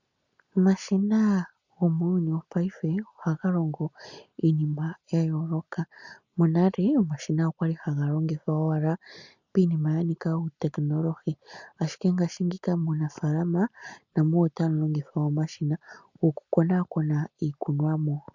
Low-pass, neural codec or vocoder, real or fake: 7.2 kHz; none; real